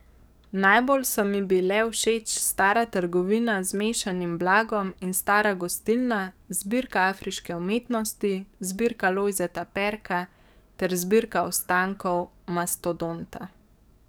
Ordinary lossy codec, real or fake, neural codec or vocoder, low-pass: none; fake; codec, 44.1 kHz, 7.8 kbps, DAC; none